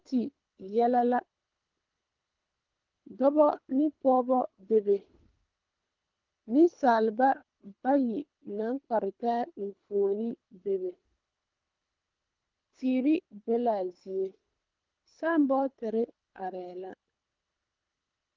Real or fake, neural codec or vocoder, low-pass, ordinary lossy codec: fake; codec, 24 kHz, 3 kbps, HILCodec; 7.2 kHz; Opus, 32 kbps